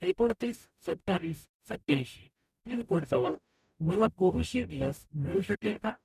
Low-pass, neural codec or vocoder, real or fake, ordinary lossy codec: 14.4 kHz; codec, 44.1 kHz, 0.9 kbps, DAC; fake; none